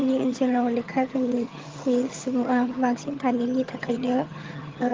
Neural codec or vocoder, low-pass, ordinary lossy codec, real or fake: vocoder, 22.05 kHz, 80 mel bands, HiFi-GAN; 7.2 kHz; Opus, 32 kbps; fake